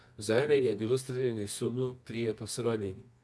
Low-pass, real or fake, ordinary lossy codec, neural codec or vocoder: none; fake; none; codec, 24 kHz, 0.9 kbps, WavTokenizer, medium music audio release